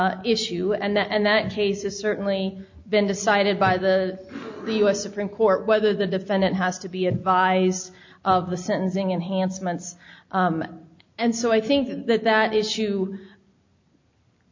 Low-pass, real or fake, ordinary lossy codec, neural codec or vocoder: 7.2 kHz; real; MP3, 64 kbps; none